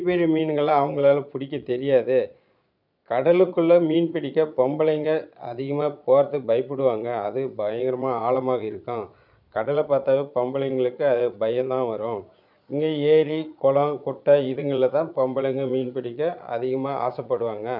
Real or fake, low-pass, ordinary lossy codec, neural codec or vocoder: fake; 5.4 kHz; none; vocoder, 22.05 kHz, 80 mel bands, Vocos